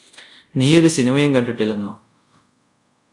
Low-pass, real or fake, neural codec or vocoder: 10.8 kHz; fake; codec, 24 kHz, 0.5 kbps, DualCodec